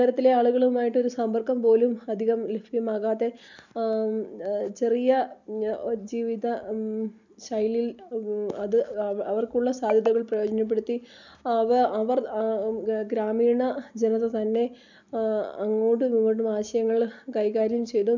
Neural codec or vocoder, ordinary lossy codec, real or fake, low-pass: none; none; real; 7.2 kHz